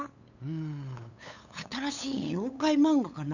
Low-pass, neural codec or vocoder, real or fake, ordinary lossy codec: 7.2 kHz; codec, 16 kHz, 8 kbps, FunCodec, trained on LibriTTS, 25 frames a second; fake; none